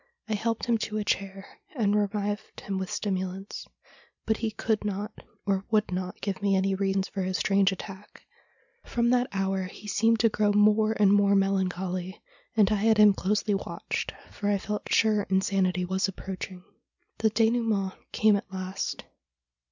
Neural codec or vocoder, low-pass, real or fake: none; 7.2 kHz; real